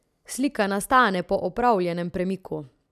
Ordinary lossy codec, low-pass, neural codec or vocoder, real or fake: none; 14.4 kHz; none; real